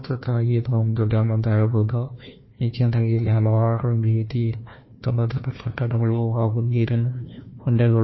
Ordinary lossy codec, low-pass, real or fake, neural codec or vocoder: MP3, 24 kbps; 7.2 kHz; fake; codec, 16 kHz, 1 kbps, FunCodec, trained on Chinese and English, 50 frames a second